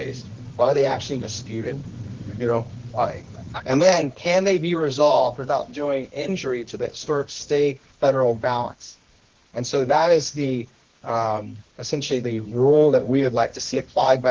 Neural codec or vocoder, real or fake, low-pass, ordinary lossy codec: codec, 24 kHz, 0.9 kbps, WavTokenizer, medium music audio release; fake; 7.2 kHz; Opus, 16 kbps